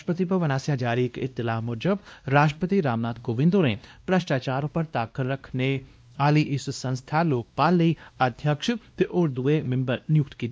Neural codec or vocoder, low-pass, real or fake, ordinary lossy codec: codec, 16 kHz, 1 kbps, X-Codec, WavLM features, trained on Multilingual LibriSpeech; none; fake; none